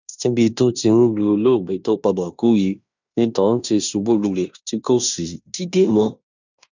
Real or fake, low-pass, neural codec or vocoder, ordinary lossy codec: fake; 7.2 kHz; codec, 16 kHz in and 24 kHz out, 0.9 kbps, LongCat-Audio-Codec, fine tuned four codebook decoder; none